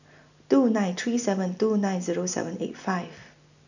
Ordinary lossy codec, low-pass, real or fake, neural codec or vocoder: none; 7.2 kHz; real; none